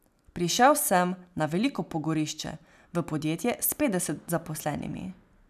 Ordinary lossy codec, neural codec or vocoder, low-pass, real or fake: none; none; 14.4 kHz; real